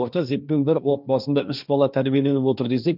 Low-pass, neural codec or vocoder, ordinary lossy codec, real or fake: 5.4 kHz; codec, 16 kHz, 1.1 kbps, Voila-Tokenizer; none; fake